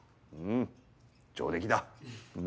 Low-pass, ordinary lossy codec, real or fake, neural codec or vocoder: none; none; real; none